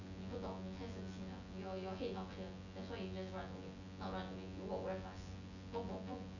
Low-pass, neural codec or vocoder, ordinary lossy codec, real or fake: 7.2 kHz; vocoder, 24 kHz, 100 mel bands, Vocos; none; fake